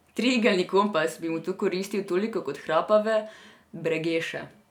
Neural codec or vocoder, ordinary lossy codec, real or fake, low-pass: none; none; real; 19.8 kHz